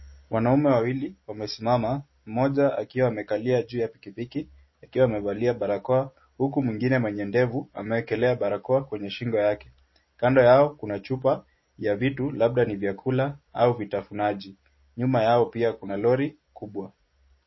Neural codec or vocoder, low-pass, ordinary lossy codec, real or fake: none; 7.2 kHz; MP3, 24 kbps; real